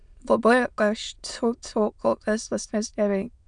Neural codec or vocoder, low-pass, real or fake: autoencoder, 22.05 kHz, a latent of 192 numbers a frame, VITS, trained on many speakers; 9.9 kHz; fake